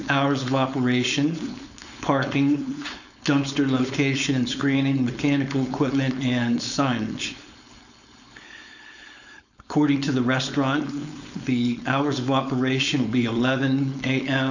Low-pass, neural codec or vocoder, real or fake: 7.2 kHz; codec, 16 kHz, 4.8 kbps, FACodec; fake